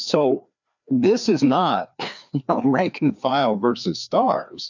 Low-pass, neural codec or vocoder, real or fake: 7.2 kHz; codec, 16 kHz, 2 kbps, FreqCodec, larger model; fake